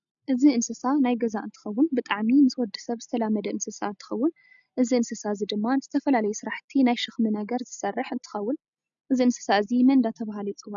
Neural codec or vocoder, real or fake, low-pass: none; real; 7.2 kHz